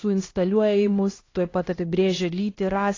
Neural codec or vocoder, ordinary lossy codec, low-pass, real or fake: codec, 16 kHz, 1 kbps, X-Codec, HuBERT features, trained on LibriSpeech; AAC, 32 kbps; 7.2 kHz; fake